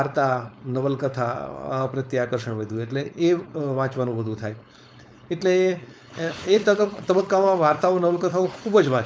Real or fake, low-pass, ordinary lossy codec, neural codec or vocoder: fake; none; none; codec, 16 kHz, 4.8 kbps, FACodec